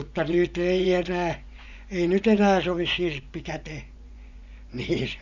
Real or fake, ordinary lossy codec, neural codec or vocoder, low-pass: fake; none; vocoder, 24 kHz, 100 mel bands, Vocos; 7.2 kHz